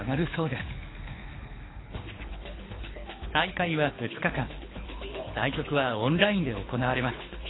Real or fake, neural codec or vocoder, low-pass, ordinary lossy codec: fake; codec, 24 kHz, 6 kbps, HILCodec; 7.2 kHz; AAC, 16 kbps